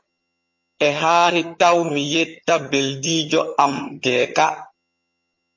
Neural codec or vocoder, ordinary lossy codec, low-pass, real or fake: vocoder, 22.05 kHz, 80 mel bands, HiFi-GAN; MP3, 32 kbps; 7.2 kHz; fake